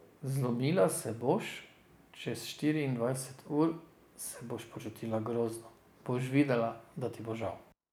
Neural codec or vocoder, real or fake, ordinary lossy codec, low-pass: none; real; none; none